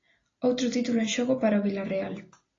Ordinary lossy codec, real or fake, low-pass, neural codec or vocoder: AAC, 32 kbps; real; 7.2 kHz; none